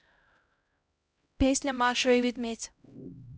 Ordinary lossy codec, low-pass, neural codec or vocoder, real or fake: none; none; codec, 16 kHz, 0.5 kbps, X-Codec, HuBERT features, trained on LibriSpeech; fake